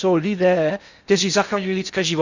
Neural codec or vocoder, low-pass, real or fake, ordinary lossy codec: codec, 16 kHz in and 24 kHz out, 0.6 kbps, FocalCodec, streaming, 4096 codes; 7.2 kHz; fake; Opus, 64 kbps